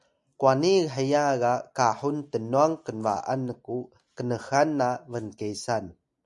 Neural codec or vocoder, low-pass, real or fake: none; 10.8 kHz; real